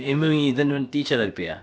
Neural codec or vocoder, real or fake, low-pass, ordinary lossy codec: codec, 16 kHz, about 1 kbps, DyCAST, with the encoder's durations; fake; none; none